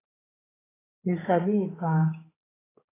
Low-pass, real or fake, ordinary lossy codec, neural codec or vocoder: 3.6 kHz; fake; AAC, 16 kbps; codec, 16 kHz, 4 kbps, X-Codec, HuBERT features, trained on general audio